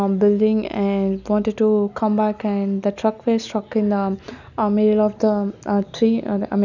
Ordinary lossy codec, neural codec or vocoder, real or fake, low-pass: none; none; real; 7.2 kHz